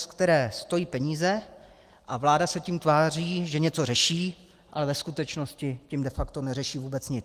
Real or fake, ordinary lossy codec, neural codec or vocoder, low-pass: real; Opus, 24 kbps; none; 14.4 kHz